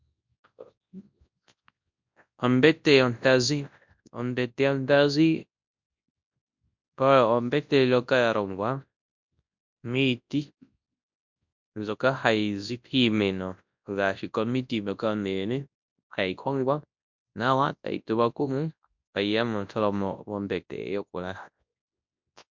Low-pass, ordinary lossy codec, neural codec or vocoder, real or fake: 7.2 kHz; MP3, 64 kbps; codec, 24 kHz, 0.9 kbps, WavTokenizer, large speech release; fake